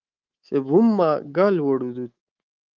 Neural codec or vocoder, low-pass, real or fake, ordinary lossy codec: codec, 24 kHz, 3.1 kbps, DualCodec; 7.2 kHz; fake; Opus, 24 kbps